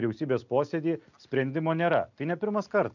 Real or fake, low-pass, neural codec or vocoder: real; 7.2 kHz; none